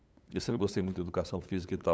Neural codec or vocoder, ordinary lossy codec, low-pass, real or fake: codec, 16 kHz, 8 kbps, FunCodec, trained on LibriTTS, 25 frames a second; none; none; fake